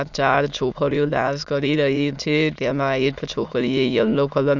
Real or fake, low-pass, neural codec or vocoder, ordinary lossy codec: fake; 7.2 kHz; autoencoder, 22.05 kHz, a latent of 192 numbers a frame, VITS, trained on many speakers; Opus, 64 kbps